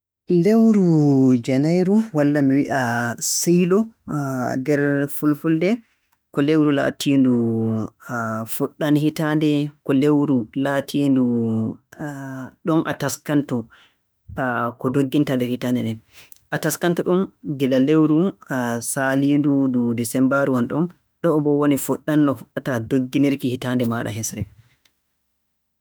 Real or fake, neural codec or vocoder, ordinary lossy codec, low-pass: fake; autoencoder, 48 kHz, 32 numbers a frame, DAC-VAE, trained on Japanese speech; none; none